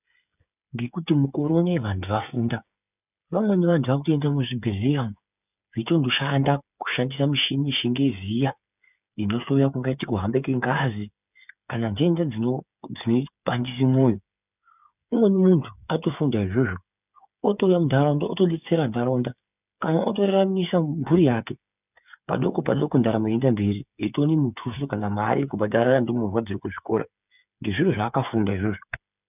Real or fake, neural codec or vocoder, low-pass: fake; codec, 16 kHz, 8 kbps, FreqCodec, smaller model; 3.6 kHz